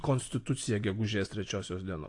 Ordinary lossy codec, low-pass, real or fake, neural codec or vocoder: AAC, 48 kbps; 10.8 kHz; real; none